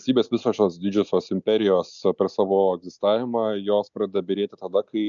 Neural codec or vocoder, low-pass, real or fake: none; 7.2 kHz; real